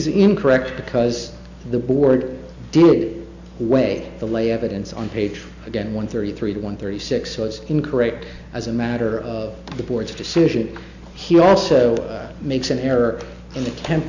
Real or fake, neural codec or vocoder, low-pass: real; none; 7.2 kHz